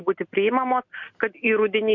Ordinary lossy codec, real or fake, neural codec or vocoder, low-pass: MP3, 64 kbps; real; none; 7.2 kHz